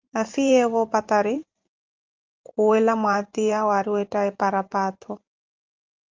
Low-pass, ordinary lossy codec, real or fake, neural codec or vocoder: 7.2 kHz; Opus, 24 kbps; fake; vocoder, 44.1 kHz, 128 mel bands every 512 samples, BigVGAN v2